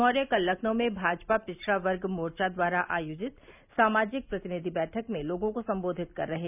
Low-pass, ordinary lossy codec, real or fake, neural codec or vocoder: 3.6 kHz; none; real; none